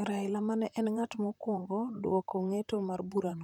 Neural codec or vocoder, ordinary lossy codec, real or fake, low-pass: vocoder, 44.1 kHz, 128 mel bands, Pupu-Vocoder; none; fake; 19.8 kHz